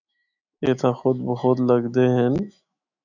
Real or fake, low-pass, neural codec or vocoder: fake; 7.2 kHz; autoencoder, 48 kHz, 128 numbers a frame, DAC-VAE, trained on Japanese speech